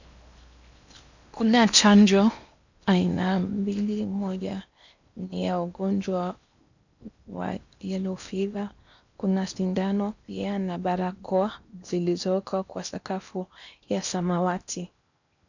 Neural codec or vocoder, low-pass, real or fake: codec, 16 kHz in and 24 kHz out, 0.8 kbps, FocalCodec, streaming, 65536 codes; 7.2 kHz; fake